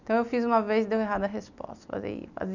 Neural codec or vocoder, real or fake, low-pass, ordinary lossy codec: none; real; 7.2 kHz; none